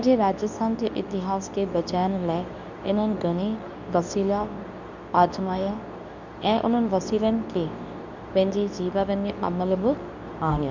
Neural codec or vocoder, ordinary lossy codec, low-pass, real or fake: codec, 24 kHz, 0.9 kbps, WavTokenizer, medium speech release version 2; none; 7.2 kHz; fake